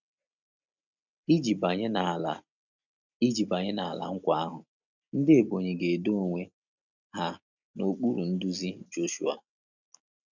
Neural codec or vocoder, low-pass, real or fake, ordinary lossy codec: none; 7.2 kHz; real; none